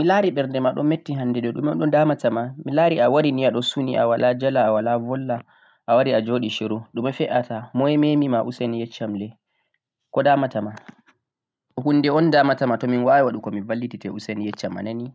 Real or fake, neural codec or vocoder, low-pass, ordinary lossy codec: real; none; none; none